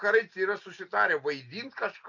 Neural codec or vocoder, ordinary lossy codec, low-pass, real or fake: none; MP3, 48 kbps; 7.2 kHz; real